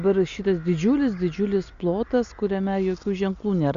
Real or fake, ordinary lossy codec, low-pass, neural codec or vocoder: real; Opus, 64 kbps; 7.2 kHz; none